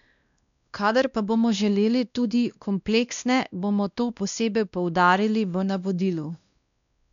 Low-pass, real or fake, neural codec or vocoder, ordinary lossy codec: 7.2 kHz; fake; codec, 16 kHz, 1 kbps, X-Codec, WavLM features, trained on Multilingual LibriSpeech; none